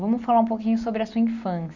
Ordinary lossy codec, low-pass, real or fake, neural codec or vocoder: none; 7.2 kHz; real; none